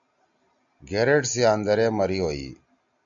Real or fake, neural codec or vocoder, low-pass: real; none; 7.2 kHz